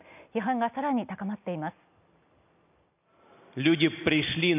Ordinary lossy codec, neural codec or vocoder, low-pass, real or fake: none; none; 3.6 kHz; real